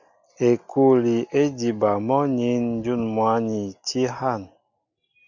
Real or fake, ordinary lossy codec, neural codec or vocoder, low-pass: real; Opus, 64 kbps; none; 7.2 kHz